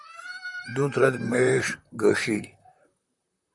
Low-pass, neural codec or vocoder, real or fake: 10.8 kHz; vocoder, 44.1 kHz, 128 mel bands, Pupu-Vocoder; fake